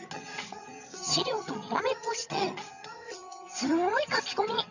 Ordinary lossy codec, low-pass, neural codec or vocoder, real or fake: none; 7.2 kHz; vocoder, 22.05 kHz, 80 mel bands, HiFi-GAN; fake